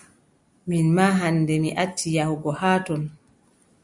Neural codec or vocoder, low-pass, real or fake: none; 10.8 kHz; real